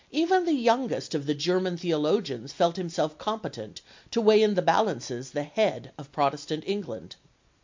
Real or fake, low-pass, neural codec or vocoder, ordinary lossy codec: real; 7.2 kHz; none; MP3, 48 kbps